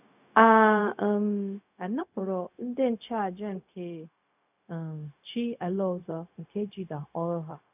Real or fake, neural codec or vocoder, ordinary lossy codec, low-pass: fake; codec, 16 kHz, 0.4 kbps, LongCat-Audio-Codec; none; 3.6 kHz